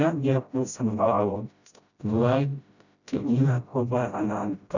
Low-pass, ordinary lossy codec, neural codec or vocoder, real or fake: 7.2 kHz; none; codec, 16 kHz, 0.5 kbps, FreqCodec, smaller model; fake